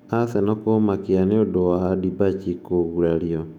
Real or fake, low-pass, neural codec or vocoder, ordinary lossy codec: fake; 19.8 kHz; autoencoder, 48 kHz, 128 numbers a frame, DAC-VAE, trained on Japanese speech; none